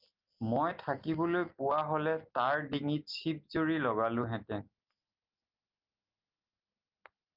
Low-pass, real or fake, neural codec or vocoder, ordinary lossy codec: 5.4 kHz; real; none; Opus, 24 kbps